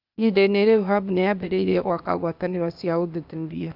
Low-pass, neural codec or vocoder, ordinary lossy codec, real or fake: 5.4 kHz; codec, 16 kHz, 0.8 kbps, ZipCodec; none; fake